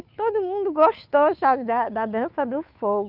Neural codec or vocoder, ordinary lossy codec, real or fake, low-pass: codec, 16 kHz, 2 kbps, FunCodec, trained on Chinese and English, 25 frames a second; none; fake; 5.4 kHz